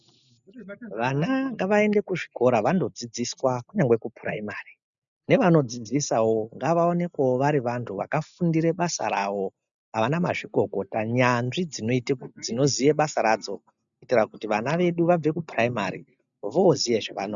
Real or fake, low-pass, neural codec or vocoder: real; 7.2 kHz; none